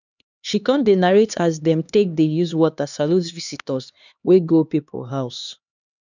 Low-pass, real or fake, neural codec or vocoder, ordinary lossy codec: 7.2 kHz; fake; codec, 16 kHz, 2 kbps, X-Codec, HuBERT features, trained on LibriSpeech; none